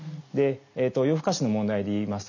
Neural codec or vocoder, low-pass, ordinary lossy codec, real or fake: none; 7.2 kHz; none; real